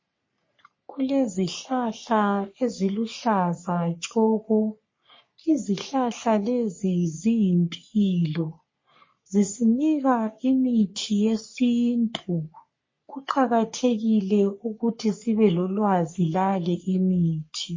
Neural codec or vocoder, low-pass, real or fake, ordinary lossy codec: codec, 44.1 kHz, 3.4 kbps, Pupu-Codec; 7.2 kHz; fake; MP3, 32 kbps